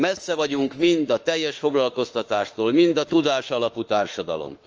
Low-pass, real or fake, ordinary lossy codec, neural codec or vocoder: 7.2 kHz; fake; Opus, 24 kbps; autoencoder, 48 kHz, 32 numbers a frame, DAC-VAE, trained on Japanese speech